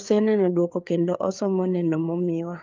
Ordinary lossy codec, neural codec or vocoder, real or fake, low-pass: Opus, 32 kbps; codec, 16 kHz, 4 kbps, FreqCodec, larger model; fake; 7.2 kHz